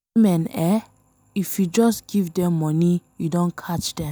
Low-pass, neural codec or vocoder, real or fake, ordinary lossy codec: none; none; real; none